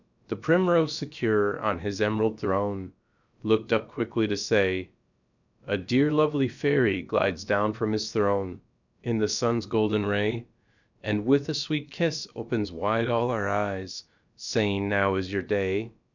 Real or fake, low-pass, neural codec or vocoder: fake; 7.2 kHz; codec, 16 kHz, about 1 kbps, DyCAST, with the encoder's durations